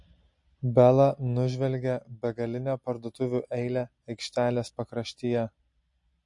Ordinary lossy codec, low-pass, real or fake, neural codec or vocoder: MP3, 48 kbps; 10.8 kHz; real; none